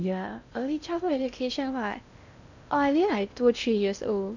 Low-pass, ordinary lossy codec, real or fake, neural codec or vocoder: 7.2 kHz; none; fake; codec, 16 kHz in and 24 kHz out, 0.6 kbps, FocalCodec, streaming, 2048 codes